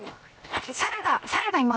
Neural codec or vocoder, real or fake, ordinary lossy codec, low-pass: codec, 16 kHz, 0.7 kbps, FocalCodec; fake; none; none